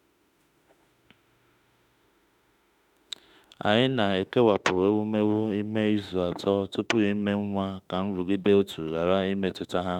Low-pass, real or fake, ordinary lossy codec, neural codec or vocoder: 19.8 kHz; fake; none; autoencoder, 48 kHz, 32 numbers a frame, DAC-VAE, trained on Japanese speech